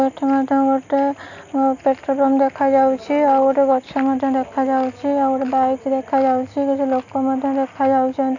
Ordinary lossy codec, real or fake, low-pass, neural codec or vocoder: none; real; 7.2 kHz; none